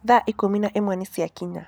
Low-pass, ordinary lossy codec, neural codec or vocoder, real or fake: none; none; none; real